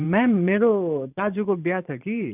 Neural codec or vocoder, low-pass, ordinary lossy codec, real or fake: vocoder, 44.1 kHz, 128 mel bands every 512 samples, BigVGAN v2; 3.6 kHz; none; fake